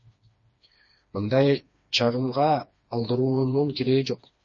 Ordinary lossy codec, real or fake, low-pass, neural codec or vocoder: MP3, 32 kbps; fake; 7.2 kHz; codec, 16 kHz, 2 kbps, FreqCodec, smaller model